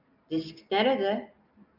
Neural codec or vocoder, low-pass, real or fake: none; 5.4 kHz; real